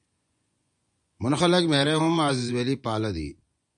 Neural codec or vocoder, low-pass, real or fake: vocoder, 24 kHz, 100 mel bands, Vocos; 10.8 kHz; fake